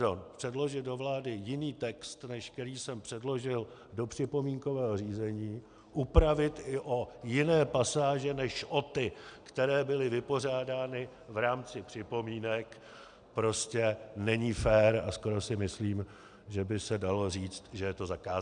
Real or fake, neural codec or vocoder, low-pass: real; none; 9.9 kHz